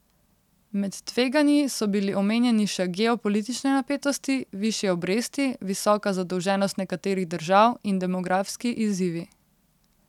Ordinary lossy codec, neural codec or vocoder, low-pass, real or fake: none; none; 19.8 kHz; real